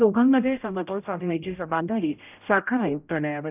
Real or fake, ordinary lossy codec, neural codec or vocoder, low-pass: fake; none; codec, 16 kHz, 0.5 kbps, X-Codec, HuBERT features, trained on general audio; 3.6 kHz